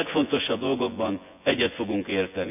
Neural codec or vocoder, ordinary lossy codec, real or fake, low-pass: vocoder, 24 kHz, 100 mel bands, Vocos; none; fake; 3.6 kHz